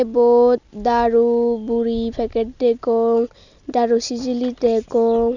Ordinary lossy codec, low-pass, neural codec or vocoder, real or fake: none; 7.2 kHz; none; real